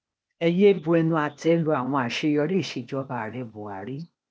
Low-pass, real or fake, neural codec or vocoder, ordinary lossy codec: none; fake; codec, 16 kHz, 0.8 kbps, ZipCodec; none